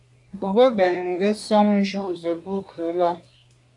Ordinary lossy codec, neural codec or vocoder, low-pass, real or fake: AAC, 64 kbps; codec, 24 kHz, 1 kbps, SNAC; 10.8 kHz; fake